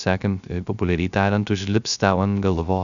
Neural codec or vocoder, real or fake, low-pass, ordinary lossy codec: codec, 16 kHz, 0.3 kbps, FocalCodec; fake; 7.2 kHz; MP3, 96 kbps